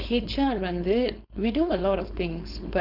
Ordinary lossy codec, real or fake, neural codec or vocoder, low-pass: none; fake; codec, 16 kHz, 4.8 kbps, FACodec; 5.4 kHz